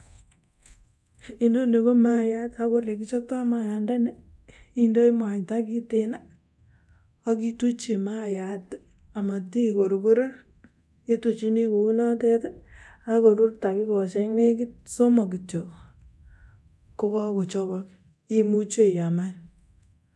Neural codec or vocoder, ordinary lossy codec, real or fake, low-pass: codec, 24 kHz, 0.9 kbps, DualCodec; none; fake; none